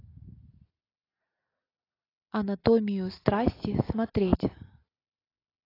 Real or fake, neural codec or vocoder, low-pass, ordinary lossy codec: real; none; 5.4 kHz; AAC, 24 kbps